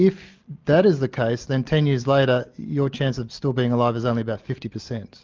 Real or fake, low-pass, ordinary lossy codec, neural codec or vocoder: real; 7.2 kHz; Opus, 32 kbps; none